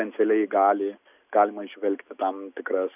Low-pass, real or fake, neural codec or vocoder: 3.6 kHz; real; none